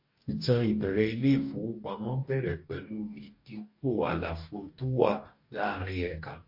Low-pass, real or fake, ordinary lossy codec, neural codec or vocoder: 5.4 kHz; fake; MP3, 32 kbps; codec, 44.1 kHz, 2.6 kbps, DAC